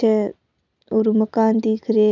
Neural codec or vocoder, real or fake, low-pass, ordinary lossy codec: none; real; 7.2 kHz; none